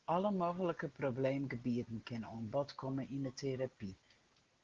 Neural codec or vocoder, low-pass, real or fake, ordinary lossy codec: none; 7.2 kHz; real; Opus, 16 kbps